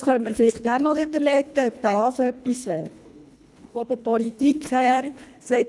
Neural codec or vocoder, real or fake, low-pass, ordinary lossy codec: codec, 24 kHz, 1.5 kbps, HILCodec; fake; none; none